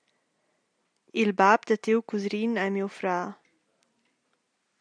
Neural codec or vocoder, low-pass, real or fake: none; 9.9 kHz; real